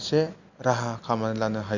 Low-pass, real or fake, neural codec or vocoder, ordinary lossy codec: 7.2 kHz; real; none; Opus, 64 kbps